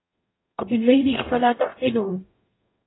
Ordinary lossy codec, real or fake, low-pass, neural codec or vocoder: AAC, 16 kbps; fake; 7.2 kHz; codec, 44.1 kHz, 0.9 kbps, DAC